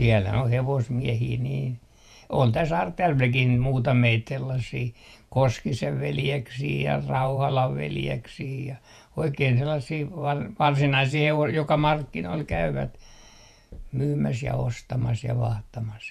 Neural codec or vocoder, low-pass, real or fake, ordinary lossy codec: vocoder, 48 kHz, 128 mel bands, Vocos; 14.4 kHz; fake; none